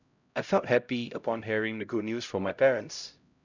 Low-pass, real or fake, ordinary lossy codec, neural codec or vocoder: 7.2 kHz; fake; none; codec, 16 kHz, 0.5 kbps, X-Codec, HuBERT features, trained on LibriSpeech